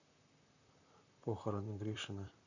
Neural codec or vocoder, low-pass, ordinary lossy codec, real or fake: vocoder, 44.1 kHz, 128 mel bands, Pupu-Vocoder; 7.2 kHz; none; fake